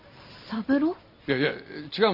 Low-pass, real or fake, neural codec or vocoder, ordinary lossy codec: 5.4 kHz; real; none; none